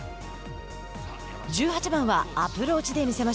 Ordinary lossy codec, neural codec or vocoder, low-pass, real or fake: none; none; none; real